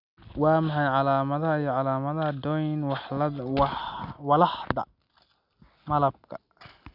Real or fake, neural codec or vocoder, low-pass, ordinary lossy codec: real; none; 5.4 kHz; none